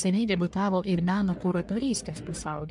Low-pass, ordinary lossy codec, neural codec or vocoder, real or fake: 10.8 kHz; MP3, 64 kbps; codec, 44.1 kHz, 1.7 kbps, Pupu-Codec; fake